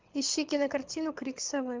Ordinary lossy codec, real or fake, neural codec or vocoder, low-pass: Opus, 24 kbps; fake; codec, 24 kHz, 6 kbps, HILCodec; 7.2 kHz